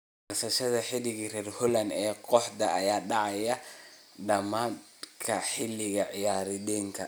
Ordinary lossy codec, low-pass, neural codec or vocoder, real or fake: none; none; none; real